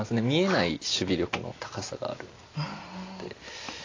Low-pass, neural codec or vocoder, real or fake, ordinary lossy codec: 7.2 kHz; none; real; AAC, 32 kbps